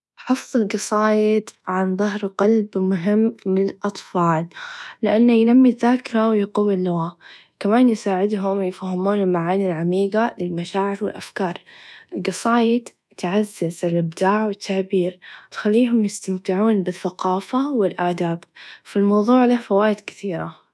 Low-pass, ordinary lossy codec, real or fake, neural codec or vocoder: none; none; fake; codec, 24 kHz, 1.2 kbps, DualCodec